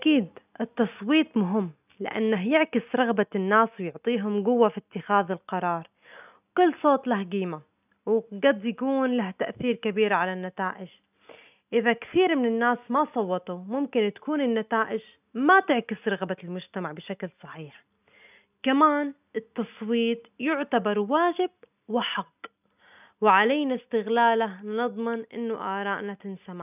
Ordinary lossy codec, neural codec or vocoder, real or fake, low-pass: none; none; real; 3.6 kHz